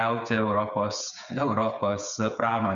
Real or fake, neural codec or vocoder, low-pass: fake; codec, 16 kHz, 8 kbps, FreqCodec, smaller model; 7.2 kHz